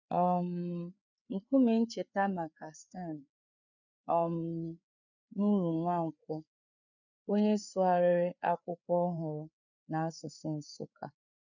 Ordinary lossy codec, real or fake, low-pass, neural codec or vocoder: none; fake; 7.2 kHz; codec, 16 kHz, 4 kbps, FreqCodec, larger model